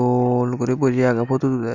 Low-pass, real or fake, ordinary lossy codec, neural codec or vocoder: 7.2 kHz; real; Opus, 64 kbps; none